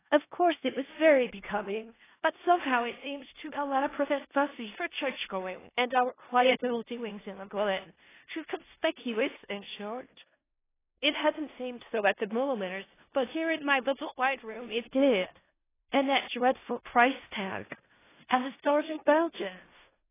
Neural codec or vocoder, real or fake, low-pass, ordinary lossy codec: codec, 16 kHz in and 24 kHz out, 0.4 kbps, LongCat-Audio-Codec, four codebook decoder; fake; 3.6 kHz; AAC, 16 kbps